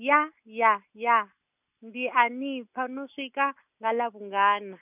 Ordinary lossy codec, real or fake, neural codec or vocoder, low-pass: none; real; none; 3.6 kHz